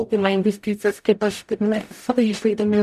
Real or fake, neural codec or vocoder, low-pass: fake; codec, 44.1 kHz, 0.9 kbps, DAC; 14.4 kHz